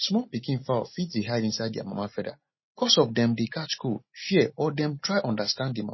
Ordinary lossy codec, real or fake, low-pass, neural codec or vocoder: MP3, 24 kbps; real; 7.2 kHz; none